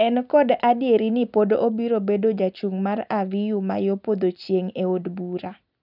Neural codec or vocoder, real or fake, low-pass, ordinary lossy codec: none; real; 5.4 kHz; none